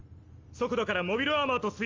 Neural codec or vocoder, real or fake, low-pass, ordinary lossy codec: none; real; 7.2 kHz; Opus, 32 kbps